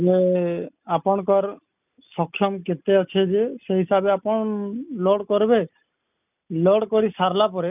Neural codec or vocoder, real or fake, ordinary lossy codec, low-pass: none; real; none; 3.6 kHz